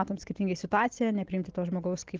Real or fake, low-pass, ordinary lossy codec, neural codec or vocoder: real; 7.2 kHz; Opus, 16 kbps; none